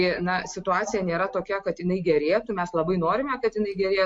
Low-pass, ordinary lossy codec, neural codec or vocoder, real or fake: 9.9 kHz; MP3, 48 kbps; none; real